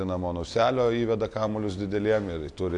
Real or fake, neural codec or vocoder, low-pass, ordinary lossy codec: real; none; 10.8 kHz; AAC, 64 kbps